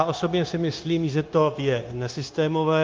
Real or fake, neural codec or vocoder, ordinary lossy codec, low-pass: fake; codec, 16 kHz, 0.9 kbps, LongCat-Audio-Codec; Opus, 24 kbps; 7.2 kHz